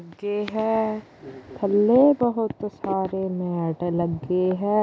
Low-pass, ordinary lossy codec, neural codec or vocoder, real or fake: none; none; none; real